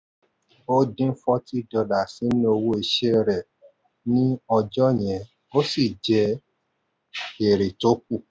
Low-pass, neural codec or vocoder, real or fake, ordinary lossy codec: none; none; real; none